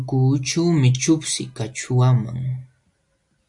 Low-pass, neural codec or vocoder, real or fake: 10.8 kHz; none; real